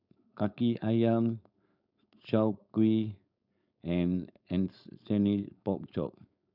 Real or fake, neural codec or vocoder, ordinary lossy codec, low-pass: fake; codec, 16 kHz, 4.8 kbps, FACodec; none; 5.4 kHz